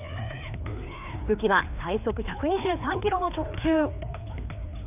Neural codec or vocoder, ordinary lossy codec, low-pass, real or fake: codec, 16 kHz, 2 kbps, FreqCodec, larger model; none; 3.6 kHz; fake